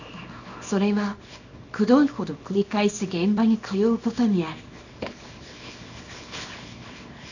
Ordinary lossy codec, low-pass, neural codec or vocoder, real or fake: none; 7.2 kHz; codec, 24 kHz, 0.9 kbps, WavTokenizer, small release; fake